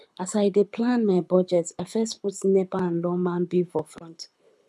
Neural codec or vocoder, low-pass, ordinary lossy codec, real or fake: codec, 24 kHz, 6 kbps, HILCodec; none; none; fake